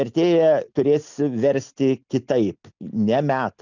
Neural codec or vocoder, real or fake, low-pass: none; real; 7.2 kHz